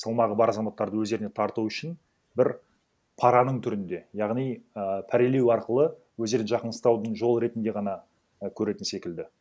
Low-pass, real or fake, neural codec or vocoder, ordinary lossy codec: none; real; none; none